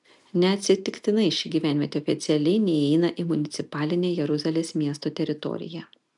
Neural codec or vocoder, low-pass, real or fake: none; 10.8 kHz; real